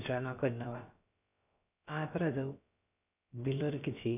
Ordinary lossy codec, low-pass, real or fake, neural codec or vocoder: none; 3.6 kHz; fake; codec, 16 kHz, about 1 kbps, DyCAST, with the encoder's durations